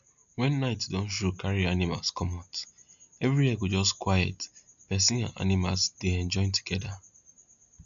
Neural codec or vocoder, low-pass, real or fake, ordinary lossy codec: none; 7.2 kHz; real; none